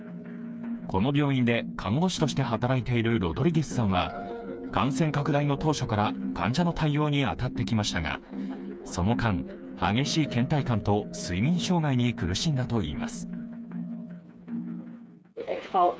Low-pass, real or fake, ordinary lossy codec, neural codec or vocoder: none; fake; none; codec, 16 kHz, 4 kbps, FreqCodec, smaller model